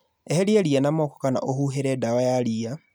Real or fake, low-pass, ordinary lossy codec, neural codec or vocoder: real; none; none; none